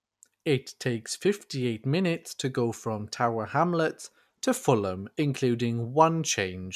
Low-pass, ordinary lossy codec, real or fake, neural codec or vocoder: 14.4 kHz; none; real; none